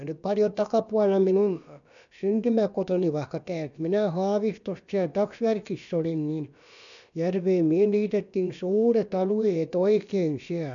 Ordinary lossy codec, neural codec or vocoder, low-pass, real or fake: none; codec, 16 kHz, about 1 kbps, DyCAST, with the encoder's durations; 7.2 kHz; fake